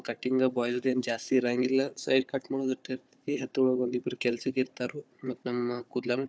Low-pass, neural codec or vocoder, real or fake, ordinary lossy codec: none; codec, 16 kHz, 4 kbps, FunCodec, trained on Chinese and English, 50 frames a second; fake; none